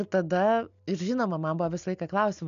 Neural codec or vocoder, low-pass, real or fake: none; 7.2 kHz; real